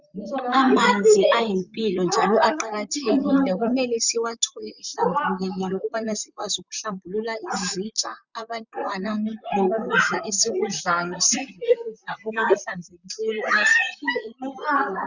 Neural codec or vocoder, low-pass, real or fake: vocoder, 44.1 kHz, 128 mel bands, Pupu-Vocoder; 7.2 kHz; fake